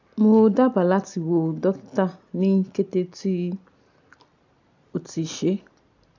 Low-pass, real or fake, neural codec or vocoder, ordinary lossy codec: 7.2 kHz; real; none; none